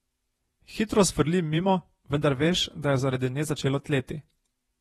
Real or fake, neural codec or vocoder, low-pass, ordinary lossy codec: fake; vocoder, 48 kHz, 128 mel bands, Vocos; 19.8 kHz; AAC, 32 kbps